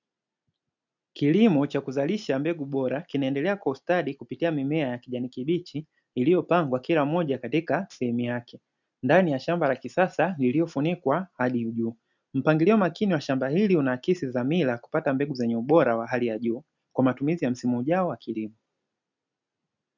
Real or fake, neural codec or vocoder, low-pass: real; none; 7.2 kHz